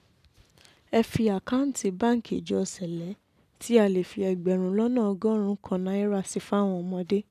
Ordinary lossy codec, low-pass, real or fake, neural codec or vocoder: none; 14.4 kHz; real; none